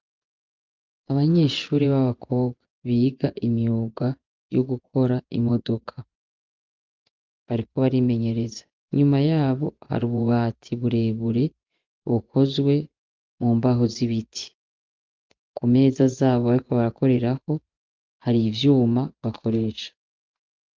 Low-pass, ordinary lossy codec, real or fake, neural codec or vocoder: 7.2 kHz; Opus, 24 kbps; fake; vocoder, 24 kHz, 100 mel bands, Vocos